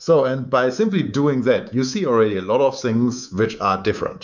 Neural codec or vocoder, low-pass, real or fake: codec, 24 kHz, 3.1 kbps, DualCodec; 7.2 kHz; fake